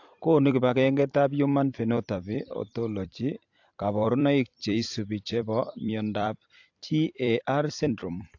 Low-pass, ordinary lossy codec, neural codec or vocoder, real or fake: 7.2 kHz; AAC, 48 kbps; vocoder, 44.1 kHz, 128 mel bands every 256 samples, BigVGAN v2; fake